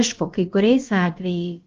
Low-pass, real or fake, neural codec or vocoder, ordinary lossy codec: 7.2 kHz; fake; codec, 16 kHz, about 1 kbps, DyCAST, with the encoder's durations; Opus, 32 kbps